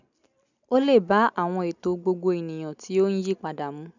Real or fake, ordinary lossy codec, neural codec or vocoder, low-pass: real; none; none; 7.2 kHz